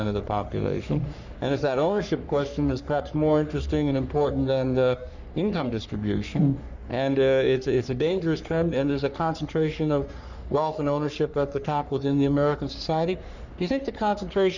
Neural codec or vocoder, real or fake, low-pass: codec, 44.1 kHz, 3.4 kbps, Pupu-Codec; fake; 7.2 kHz